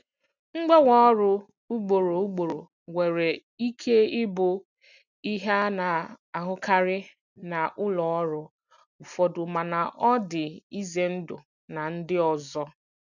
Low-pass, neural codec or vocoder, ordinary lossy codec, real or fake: 7.2 kHz; none; none; real